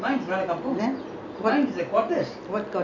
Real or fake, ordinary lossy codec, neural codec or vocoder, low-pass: real; none; none; 7.2 kHz